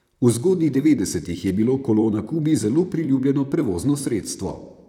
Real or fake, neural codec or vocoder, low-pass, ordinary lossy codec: fake; vocoder, 44.1 kHz, 128 mel bands, Pupu-Vocoder; 19.8 kHz; none